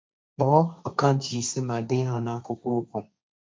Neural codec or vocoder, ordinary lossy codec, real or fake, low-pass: codec, 16 kHz, 1.1 kbps, Voila-Tokenizer; none; fake; none